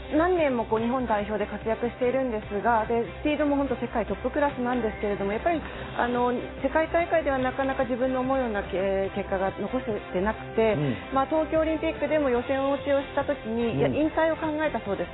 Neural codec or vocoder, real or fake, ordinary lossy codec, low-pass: none; real; AAC, 16 kbps; 7.2 kHz